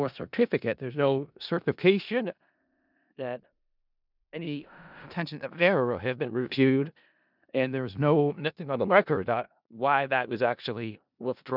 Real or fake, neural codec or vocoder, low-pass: fake; codec, 16 kHz in and 24 kHz out, 0.4 kbps, LongCat-Audio-Codec, four codebook decoder; 5.4 kHz